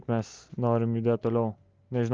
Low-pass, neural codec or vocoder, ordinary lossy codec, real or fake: 7.2 kHz; none; Opus, 32 kbps; real